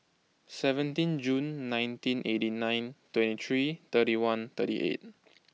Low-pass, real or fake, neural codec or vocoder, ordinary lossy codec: none; real; none; none